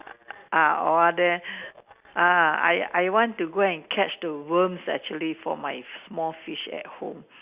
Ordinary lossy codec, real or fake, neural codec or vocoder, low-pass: Opus, 64 kbps; real; none; 3.6 kHz